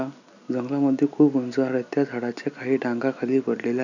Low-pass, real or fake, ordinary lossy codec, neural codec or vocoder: 7.2 kHz; real; none; none